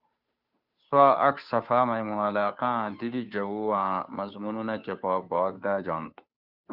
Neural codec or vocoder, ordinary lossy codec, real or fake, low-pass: codec, 16 kHz, 2 kbps, FunCodec, trained on Chinese and English, 25 frames a second; Opus, 64 kbps; fake; 5.4 kHz